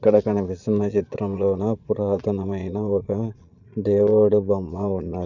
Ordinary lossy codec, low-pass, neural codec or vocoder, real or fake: none; 7.2 kHz; vocoder, 22.05 kHz, 80 mel bands, Vocos; fake